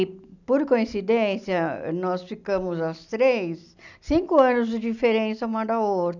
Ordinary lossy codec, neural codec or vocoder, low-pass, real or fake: none; none; 7.2 kHz; real